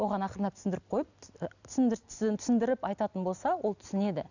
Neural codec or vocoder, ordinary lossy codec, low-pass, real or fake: none; none; 7.2 kHz; real